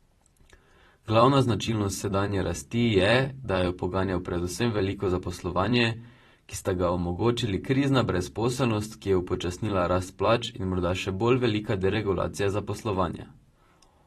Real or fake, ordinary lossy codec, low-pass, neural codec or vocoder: fake; AAC, 32 kbps; 19.8 kHz; vocoder, 44.1 kHz, 128 mel bands every 256 samples, BigVGAN v2